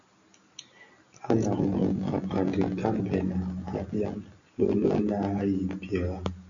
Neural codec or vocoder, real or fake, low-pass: none; real; 7.2 kHz